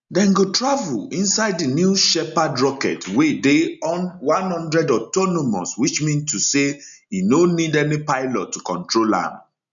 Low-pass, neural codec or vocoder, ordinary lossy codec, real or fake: 7.2 kHz; none; none; real